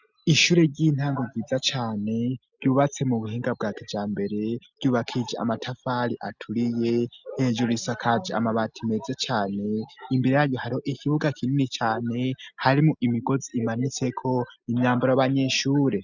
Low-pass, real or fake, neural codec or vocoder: 7.2 kHz; real; none